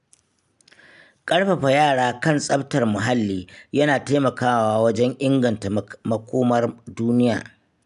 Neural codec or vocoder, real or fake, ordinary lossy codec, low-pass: none; real; none; 10.8 kHz